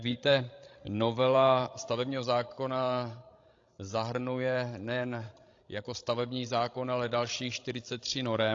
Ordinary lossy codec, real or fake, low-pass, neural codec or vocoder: AAC, 48 kbps; fake; 7.2 kHz; codec, 16 kHz, 16 kbps, FreqCodec, larger model